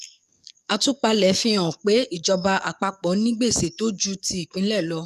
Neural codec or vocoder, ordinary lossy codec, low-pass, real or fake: codec, 44.1 kHz, 7.8 kbps, DAC; none; 14.4 kHz; fake